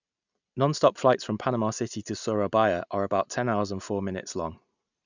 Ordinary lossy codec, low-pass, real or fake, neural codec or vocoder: none; 7.2 kHz; real; none